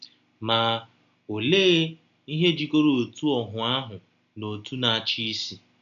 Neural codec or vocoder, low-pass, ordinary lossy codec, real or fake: none; 7.2 kHz; none; real